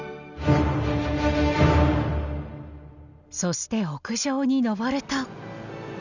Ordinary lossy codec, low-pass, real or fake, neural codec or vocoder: none; 7.2 kHz; real; none